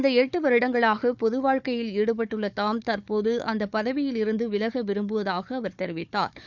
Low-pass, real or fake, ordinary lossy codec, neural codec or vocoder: 7.2 kHz; fake; none; codec, 16 kHz, 4 kbps, FunCodec, trained on Chinese and English, 50 frames a second